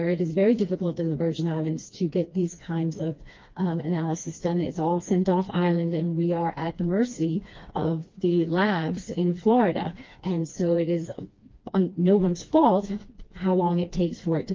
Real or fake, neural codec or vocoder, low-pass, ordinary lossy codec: fake; codec, 16 kHz, 2 kbps, FreqCodec, smaller model; 7.2 kHz; Opus, 32 kbps